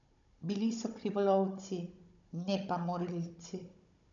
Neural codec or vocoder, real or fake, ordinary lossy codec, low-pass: codec, 16 kHz, 16 kbps, FunCodec, trained on Chinese and English, 50 frames a second; fake; none; 7.2 kHz